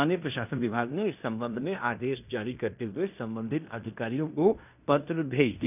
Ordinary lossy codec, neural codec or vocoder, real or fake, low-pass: none; codec, 16 kHz in and 24 kHz out, 0.9 kbps, LongCat-Audio-Codec, fine tuned four codebook decoder; fake; 3.6 kHz